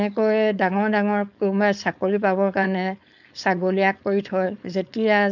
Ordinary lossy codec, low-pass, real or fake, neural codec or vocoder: none; 7.2 kHz; fake; codec, 16 kHz, 4.8 kbps, FACodec